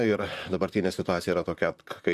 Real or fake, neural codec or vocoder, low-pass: fake; vocoder, 44.1 kHz, 128 mel bands, Pupu-Vocoder; 14.4 kHz